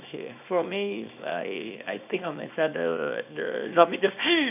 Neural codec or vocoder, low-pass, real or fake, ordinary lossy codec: codec, 24 kHz, 0.9 kbps, WavTokenizer, small release; 3.6 kHz; fake; none